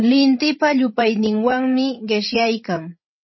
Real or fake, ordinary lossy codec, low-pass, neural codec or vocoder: fake; MP3, 24 kbps; 7.2 kHz; codec, 16 kHz, 16 kbps, FreqCodec, larger model